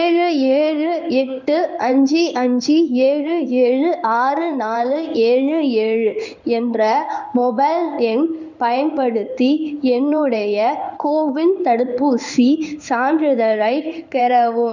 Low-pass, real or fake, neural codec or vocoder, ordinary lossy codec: 7.2 kHz; fake; codec, 16 kHz in and 24 kHz out, 1 kbps, XY-Tokenizer; none